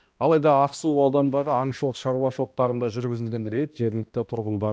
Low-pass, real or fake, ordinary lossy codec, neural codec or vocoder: none; fake; none; codec, 16 kHz, 1 kbps, X-Codec, HuBERT features, trained on balanced general audio